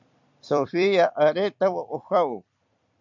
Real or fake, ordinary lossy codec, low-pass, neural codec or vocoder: fake; MP3, 64 kbps; 7.2 kHz; vocoder, 44.1 kHz, 128 mel bands every 256 samples, BigVGAN v2